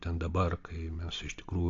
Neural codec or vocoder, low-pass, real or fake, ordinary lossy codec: none; 7.2 kHz; real; AAC, 48 kbps